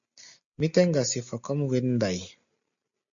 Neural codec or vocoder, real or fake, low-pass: none; real; 7.2 kHz